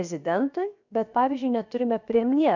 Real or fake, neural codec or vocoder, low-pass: fake; codec, 16 kHz, 0.7 kbps, FocalCodec; 7.2 kHz